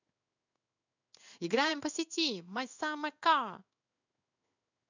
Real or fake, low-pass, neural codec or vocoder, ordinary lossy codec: fake; 7.2 kHz; codec, 16 kHz in and 24 kHz out, 1 kbps, XY-Tokenizer; none